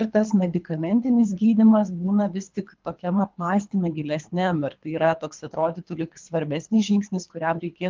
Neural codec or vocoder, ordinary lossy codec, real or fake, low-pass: codec, 24 kHz, 3 kbps, HILCodec; Opus, 24 kbps; fake; 7.2 kHz